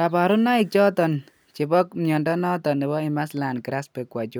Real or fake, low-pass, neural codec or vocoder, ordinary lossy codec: real; none; none; none